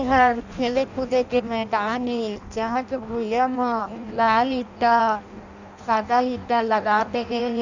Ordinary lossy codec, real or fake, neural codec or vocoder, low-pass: none; fake; codec, 16 kHz in and 24 kHz out, 0.6 kbps, FireRedTTS-2 codec; 7.2 kHz